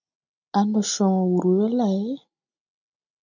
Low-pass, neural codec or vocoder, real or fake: 7.2 kHz; none; real